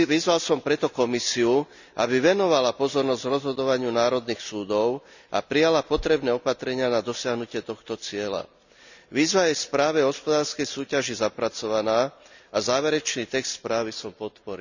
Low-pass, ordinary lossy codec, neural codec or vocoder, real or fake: 7.2 kHz; none; none; real